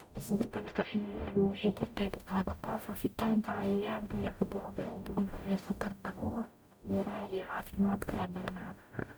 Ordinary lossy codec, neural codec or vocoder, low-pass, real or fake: none; codec, 44.1 kHz, 0.9 kbps, DAC; none; fake